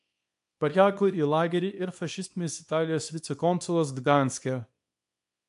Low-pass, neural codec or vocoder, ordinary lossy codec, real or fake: 10.8 kHz; codec, 24 kHz, 0.9 kbps, WavTokenizer, small release; AAC, 64 kbps; fake